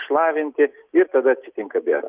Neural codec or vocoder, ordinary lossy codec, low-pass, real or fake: none; Opus, 24 kbps; 3.6 kHz; real